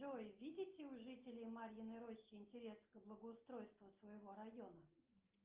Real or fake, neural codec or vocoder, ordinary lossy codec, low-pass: real; none; Opus, 24 kbps; 3.6 kHz